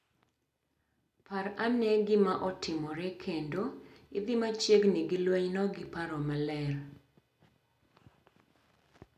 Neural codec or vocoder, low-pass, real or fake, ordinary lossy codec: none; 14.4 kHz; real; none